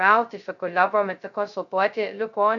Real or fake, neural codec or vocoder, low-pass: fake; codec, 16 kHz, 0.2 kbps, FocalCodec; 7.2 kHz